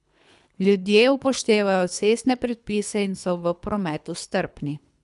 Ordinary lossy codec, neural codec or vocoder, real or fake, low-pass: none; codec, 24 kHz, 3 kbps, HILCodec; fake; 10.8 kHz